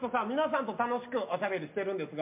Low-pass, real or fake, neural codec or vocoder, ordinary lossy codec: 3.6 kHz; real; none; MP3, 32 kbps